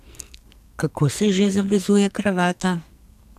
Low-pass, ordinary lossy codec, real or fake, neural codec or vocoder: 14.4 kHz; none; fake; codec, 44.1 kHz, 2.6 kbps, SNAC